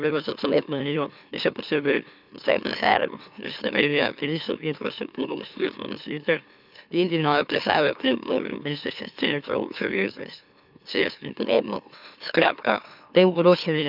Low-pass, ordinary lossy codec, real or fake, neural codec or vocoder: 5.4 kHz; none; fake; autoencoder, 44.1 kHz, a latent of 192 numbers a frame, MeloTTS